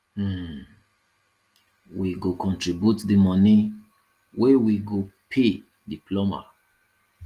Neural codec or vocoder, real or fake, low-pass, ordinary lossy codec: none; real; 14.4 kHz; Opus, 24 kbps